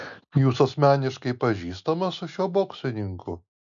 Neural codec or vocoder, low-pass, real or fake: none; 7.2 kHz; real